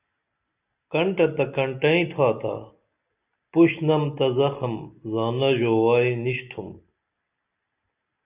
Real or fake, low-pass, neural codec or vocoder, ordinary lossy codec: real; 3.6 kHz; none; Opus, 64 kbps